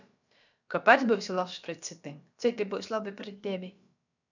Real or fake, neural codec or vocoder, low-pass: fake; codec, 16 kHz, about 1 kbps, DyCAST, with the encoder's durations; 7.2 kHz